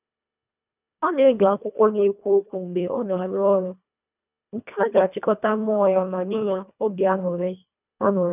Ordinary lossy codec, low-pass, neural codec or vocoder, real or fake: none; 3.6 kHz; codec, 24 kHz, 1.5 kbps, HILCodec; fake